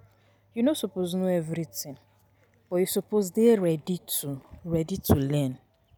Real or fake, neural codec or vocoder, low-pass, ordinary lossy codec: real; none; none; none